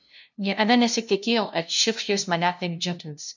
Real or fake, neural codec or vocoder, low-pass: fake; codec, 16 kHz, 0.5 kbps, FunCodec, trained on LibriTTS, 25 frames a second; 7.2 kHz